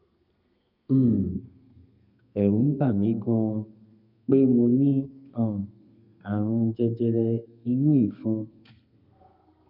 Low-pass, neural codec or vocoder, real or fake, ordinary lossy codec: 5.4 kHz; codec, 32 kHz, 1.9 kbps, SNAC; fake; none